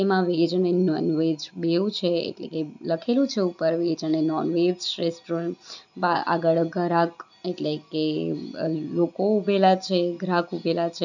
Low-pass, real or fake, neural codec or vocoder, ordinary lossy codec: 7.2 kHz; real; none; none